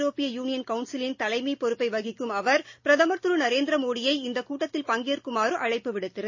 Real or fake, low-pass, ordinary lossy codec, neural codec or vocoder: real; 7.2 kHz; MP3, 32 kbps; none